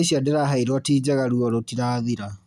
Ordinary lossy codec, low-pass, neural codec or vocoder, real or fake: none; none; none; real